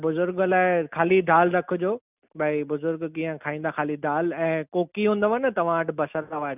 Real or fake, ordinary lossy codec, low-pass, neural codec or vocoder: real; none; 3.6 kHz; none